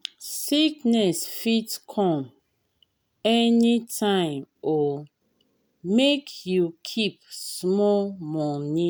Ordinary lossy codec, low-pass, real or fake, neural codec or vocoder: none; none; real; none